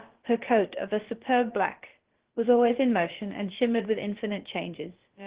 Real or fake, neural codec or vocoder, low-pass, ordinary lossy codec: fake; codec, 16 kHz, about 1 kbps, DyCAST, with the encoder's durations; 3.6 kHz; Opus, 16 kbps